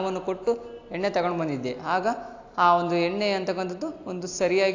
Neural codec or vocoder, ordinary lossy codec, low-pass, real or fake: none; AAC, 48 kbps; 7.2 kHz; real